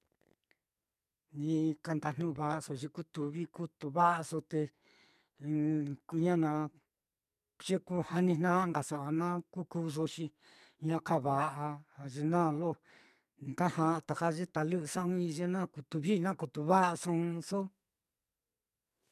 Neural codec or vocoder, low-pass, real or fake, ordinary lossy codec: codec, 44.1 kHz, 2.6 kbps, SNAC; 14.4 kHz; fake; MP3, 96 kbps